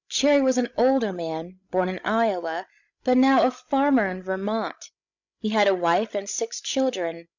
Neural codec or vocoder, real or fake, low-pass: codec, 16 kHz, 16 kbps, FreqCodec, larger model; fake; 7.2 kHz